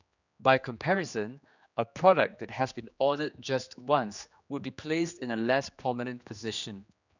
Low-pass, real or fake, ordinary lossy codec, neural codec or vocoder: 7.2 kHz; fake; none; codec, 16 kHz, 2 kbps, X-Codec, HuBERT features, trained on general audio